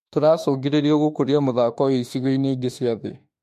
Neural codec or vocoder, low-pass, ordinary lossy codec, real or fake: autoencoder, 48 kHz, 32 numbers a frame, DAC-VAE, trained on Japanese speech; 14.4 kHz; MP3, 64 kbps; fake